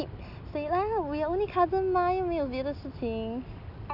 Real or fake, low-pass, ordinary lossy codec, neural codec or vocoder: real; 5.4 kHz; none; none